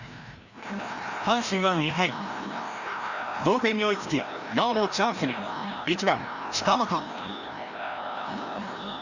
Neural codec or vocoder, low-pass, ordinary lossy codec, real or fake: codec, 16 kHz, 1 kbps, FreqCodec, larger model; 7.2 kHz; none; fake